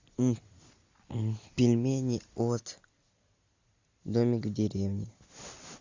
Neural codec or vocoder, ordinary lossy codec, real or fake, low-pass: none; AAC, 48 kbps; real; 7.2 kHz